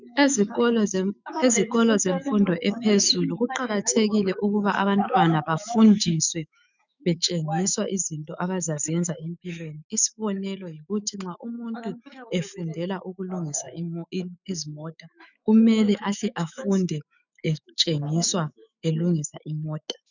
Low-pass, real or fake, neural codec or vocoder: 7.2 kHz; fake; autoencoder, 48 kHz, 128 numbers a frame, DAC-VAE, trained on Japanese speech